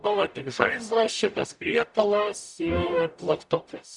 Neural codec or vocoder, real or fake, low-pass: codec, 44.1 kHz, 0.9 kbps, DAC; fake; 10.8 kHz